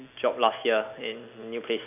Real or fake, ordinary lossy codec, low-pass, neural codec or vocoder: real; none; 3.6 kHz; none